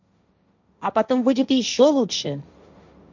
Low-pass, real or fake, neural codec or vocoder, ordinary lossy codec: 7.2 kHz; fake; codec, 16 kHz, 1.1 kbps, Voila-Tokenizer; none